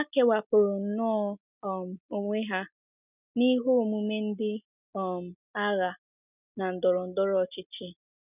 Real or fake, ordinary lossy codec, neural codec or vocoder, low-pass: real; none; none; 3.6 kHz